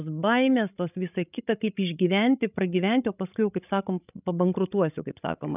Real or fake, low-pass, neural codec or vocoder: fake; 3.6 kHz; codec, 16 kHz, 16 kbps, FreqCodec, larger model